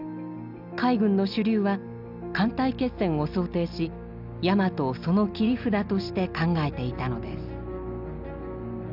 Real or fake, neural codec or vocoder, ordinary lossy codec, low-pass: real; none; none; 5.4 kHz